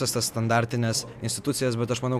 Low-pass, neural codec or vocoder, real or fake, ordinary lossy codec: 14.4 kHz; none; real; MP3, 96 kbps